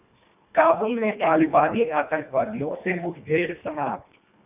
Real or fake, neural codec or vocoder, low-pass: fake; codec, 24 kHz, 1.5 kbps, HILCodec; 3.6 kHz